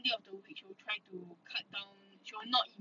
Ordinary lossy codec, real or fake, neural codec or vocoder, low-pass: none; real; none; 7.2 kHz